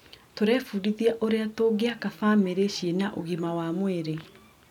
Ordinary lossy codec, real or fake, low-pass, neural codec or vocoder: none; real; 19.8 kHz; none